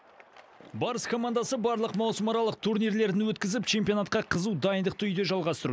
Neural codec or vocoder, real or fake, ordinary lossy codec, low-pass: none; real; none; none